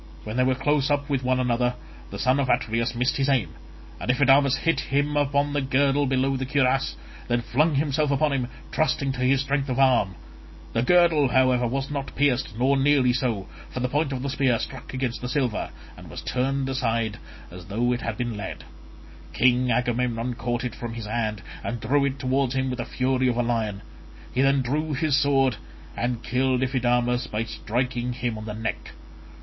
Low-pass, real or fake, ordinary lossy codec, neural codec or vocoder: 7.2 kHz; real; MP3, 24 kbps; none